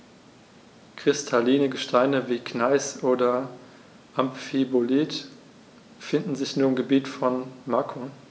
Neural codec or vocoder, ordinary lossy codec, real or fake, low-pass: none; none; real; none